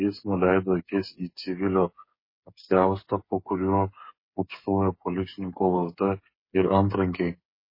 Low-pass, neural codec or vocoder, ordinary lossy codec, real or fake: 5.4 kHz; codec, 16 kHz, 8 kbps, FreqCodec, smaller model; MP3, 24 kbps; fake